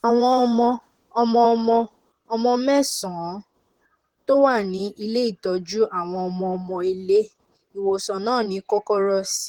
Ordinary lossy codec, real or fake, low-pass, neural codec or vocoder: Opus, 16 kbps; fake; 19.8 kHz; vocoder, 44.1 kHz, 128 mel bands, Pupu-Vocoder